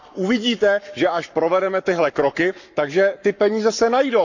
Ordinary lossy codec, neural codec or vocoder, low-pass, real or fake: none; codec, 44.1 kHz, 7.8 kbps, Pupu-Codec; 7.2 kHz; fake